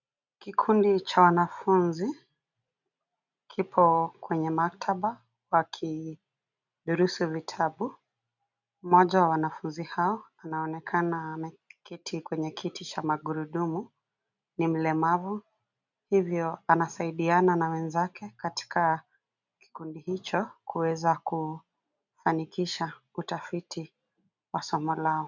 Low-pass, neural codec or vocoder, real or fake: 7.2 kHz; none; real